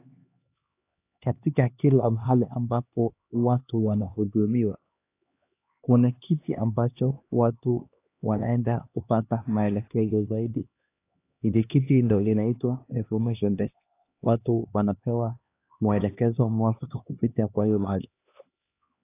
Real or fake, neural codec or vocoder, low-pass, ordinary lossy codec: fake; codec, 16 kHz, 2 kbps, X-Codec, HuBERT features, trained on LibriSpeech; 3.6 kHz; AAC, 24 kbps